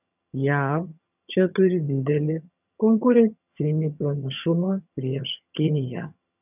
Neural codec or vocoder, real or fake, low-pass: vocoder, 22.05 kHz, 80 mel bands, HiFi-GAN; fake; 3.6 kHz